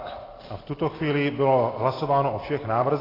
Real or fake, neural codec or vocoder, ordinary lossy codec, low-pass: real; none; AAC, 24 kbps; 5.4 kHz